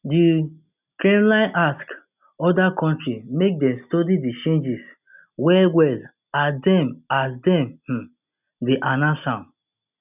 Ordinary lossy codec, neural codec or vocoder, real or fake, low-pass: none; none; real; 3.6 kHz